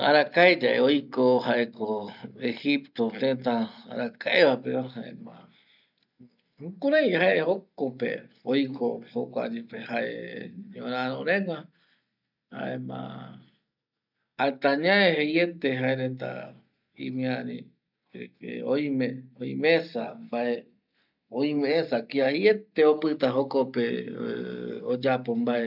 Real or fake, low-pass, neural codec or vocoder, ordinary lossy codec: real; 5.4 kHz; none; none